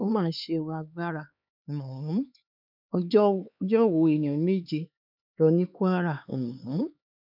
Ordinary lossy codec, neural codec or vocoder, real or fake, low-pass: none; codec, 16 kHz, 4 kbps, X-Codec, HuBERT features, trained on LibriSpeech; fake; 5.4 kHz